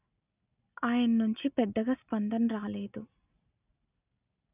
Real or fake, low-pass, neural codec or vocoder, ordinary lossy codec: real; 3.6 kHz; none; none